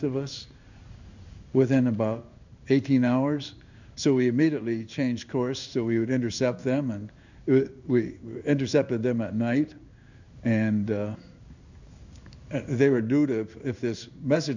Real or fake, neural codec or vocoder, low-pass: fake; codec, 16 kHz in and 24 kHz out, 1 kbps, XY-Tokenizer; 7.2 kHz